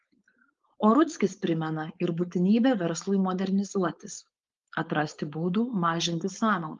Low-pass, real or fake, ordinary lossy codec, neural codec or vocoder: 7.2 kHz; fake; Opus, 32 kbps; codec, 16 kHz, 4.8 kbps, FACodec